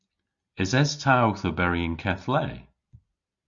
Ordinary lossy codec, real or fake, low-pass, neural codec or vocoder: Opus, 64 kbps; real; 7.2 kHz; none